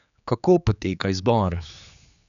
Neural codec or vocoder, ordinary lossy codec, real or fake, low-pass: codec, 16 kHz, 4 kbps, X-Codec, HuBERT features, trained on general audio; none; fake; 7.2 kHz